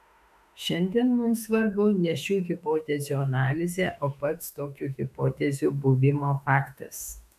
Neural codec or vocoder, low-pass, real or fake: autoencoder, 48 kHz, 32 numbers a frame, DAC-VAE, trained on Japanese speech; 14.4 kHz; fake